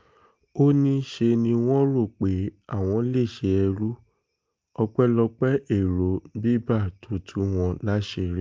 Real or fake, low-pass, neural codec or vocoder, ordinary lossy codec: real; 7.2 kHz; none; Opus, 24 kbps